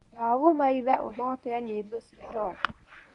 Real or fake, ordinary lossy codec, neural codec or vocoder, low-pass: fake; none; codec, 24 kHz, 0.9 kbps, WavTokenizer, medium speech release version 1; 10.8 kHz